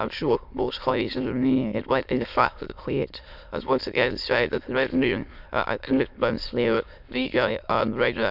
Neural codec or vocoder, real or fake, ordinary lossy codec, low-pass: autoencoder, 22.05 kHz, a latent of 192 numbers a frame, VITS, trained on many speakers; fake; none; 5.4 kHz